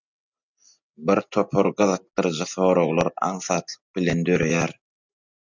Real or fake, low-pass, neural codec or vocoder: real; 7.2 kHz; none